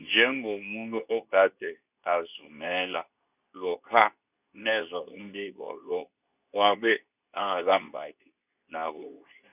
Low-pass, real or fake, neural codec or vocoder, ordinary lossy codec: 3.6 kHz; fake; codec, 24 kHz, 0.9 kbps, WavTokenizer, medium speech release version 2; none